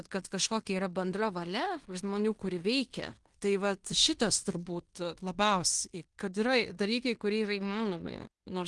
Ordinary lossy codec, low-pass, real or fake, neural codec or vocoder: Opus, 24 kbps; 10.8 kHz; fake; codec, 16 kHz in and 24 kHz out, 0.9 kbps, LongCat-Audio-Codec, fine tuned four codebook decoder